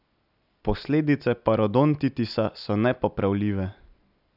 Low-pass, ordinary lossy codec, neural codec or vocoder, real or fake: 5.4 kHz; none; none; real